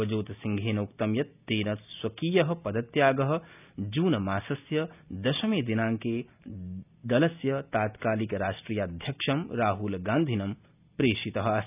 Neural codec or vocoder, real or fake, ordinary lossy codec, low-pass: none; real; none; 3.6 kHz